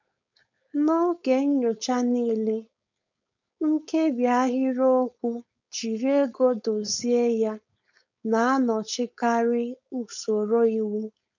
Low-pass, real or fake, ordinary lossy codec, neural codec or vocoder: 7.2 kHz; fake; none; codec, 16 kHz, 4.8 kbps, FACodec